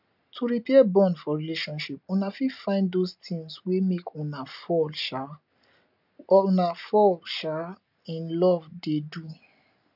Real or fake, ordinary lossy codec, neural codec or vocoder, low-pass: real; none; none; 5.4 kHz